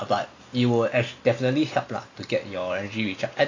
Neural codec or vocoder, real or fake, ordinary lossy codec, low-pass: none; real; AAC, 32 kbps; 7.2 kHz